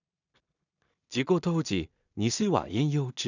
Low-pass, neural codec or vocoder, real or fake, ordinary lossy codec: 7.2 kHz; codec, 16 kHz in and 24 kHz out, 0.4 kbps, LongCat-Audio-Codec, two codebook decoder; fake; none